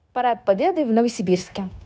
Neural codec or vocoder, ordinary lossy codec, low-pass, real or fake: codec, 16 kHz, 0.9 kbps, LongCat-Audio-Codec; none; none; fake